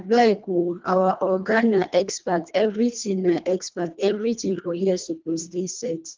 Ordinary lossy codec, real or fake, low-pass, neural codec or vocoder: Opus, 32 kbps; fake; 7.2 kHz; codec, 24 kHz, 1.5 kbps, HILCodec